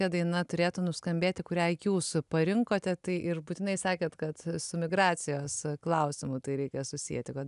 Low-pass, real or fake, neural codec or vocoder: 10.8 kHz; real; none